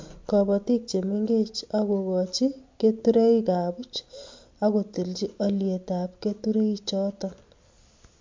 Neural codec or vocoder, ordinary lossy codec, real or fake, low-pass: none; MP3, 48 kbps; real; 7.2 kHz